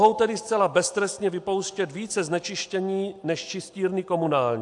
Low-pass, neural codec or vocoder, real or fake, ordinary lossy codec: 10.8 kHz; none; real; AAC, 64 kbps